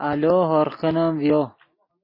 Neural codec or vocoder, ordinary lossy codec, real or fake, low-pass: none; MP3, 24 kbps; real; 5.4 kHz